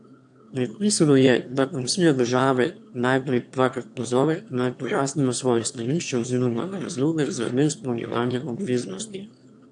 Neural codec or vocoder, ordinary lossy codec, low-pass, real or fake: autoencoder, 22.05 kHz, a latent of 192 numbers a frame, VITS, trained on one speaker; AAC, 64 kbps; 9.9 kHz; fake